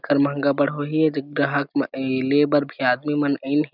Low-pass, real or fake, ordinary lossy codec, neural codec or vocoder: 5.4 kHz; real; none; none